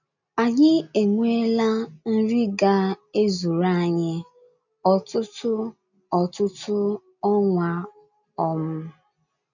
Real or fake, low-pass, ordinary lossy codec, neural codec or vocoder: real; 7.2 kHz; none; none